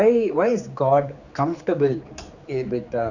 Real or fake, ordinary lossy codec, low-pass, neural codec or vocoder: fake; none; 7.2 kHz; codec, 16 kHz, 4 kbps, X-Codec, HuBERT features, trained on general audio